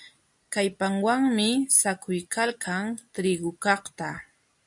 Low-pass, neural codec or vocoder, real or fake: 10.8 kHz; none; real